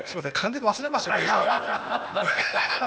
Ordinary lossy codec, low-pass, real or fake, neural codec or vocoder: none; none; fake; codec, 16 kHz, 0.8 kbps, ZipCodec